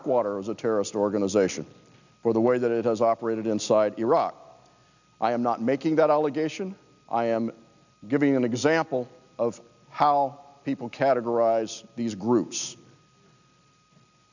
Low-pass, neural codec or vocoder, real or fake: 7.2 kHz; none; real